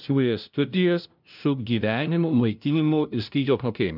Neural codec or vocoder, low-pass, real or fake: codec, 16 kHz, 0.5 kbps, FunCodec, trained on LibriTTS, 25 frames a second; 5.4 kHz; fake